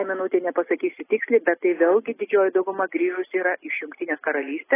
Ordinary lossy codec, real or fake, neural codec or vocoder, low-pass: AAC, 16 kbps; real; none; 3.6 kHz